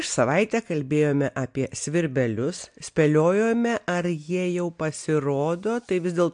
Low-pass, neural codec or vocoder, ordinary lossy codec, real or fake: 9.9 kHz; none; MP3, 64 kbps; real